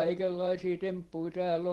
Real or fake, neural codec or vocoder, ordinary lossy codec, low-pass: fake; vocoder, 44.1 kHz, 128 mel bands every 512 samples, BigVGAN v2; Opus, 16 kbps; 19.8 kHz